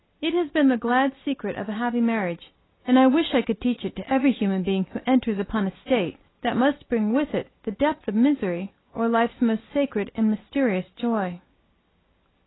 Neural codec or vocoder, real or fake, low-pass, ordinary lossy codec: none; real; 7.2 kHz; AAC, 16 kbps